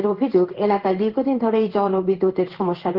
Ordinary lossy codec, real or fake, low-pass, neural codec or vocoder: Opus, 16 kbps; fake; 5.4 kHz; codec, 16 kHz in and 24 kHz out, 1 kbps, XY-Tokenizer